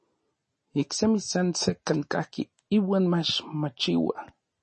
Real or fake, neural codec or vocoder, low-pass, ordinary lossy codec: real; none; 9.9 kHz; MP3, 32 kbps